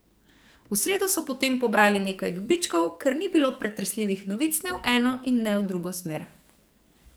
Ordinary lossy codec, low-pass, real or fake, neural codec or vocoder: none; none; fake; codec, 44.1 kHz, 2.6 kbps, SNAC